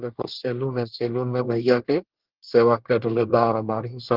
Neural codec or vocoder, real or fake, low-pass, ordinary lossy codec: codec, 24 kHz, 1 kbps, SNAC; fake; 5.4 kHz; Opus, 16 kbps